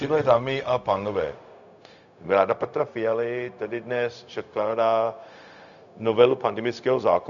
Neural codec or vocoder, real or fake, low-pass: codec, 16 kHz, 0.4 kbps, LongCat-Audio-Codec; fake; 7.2 kHz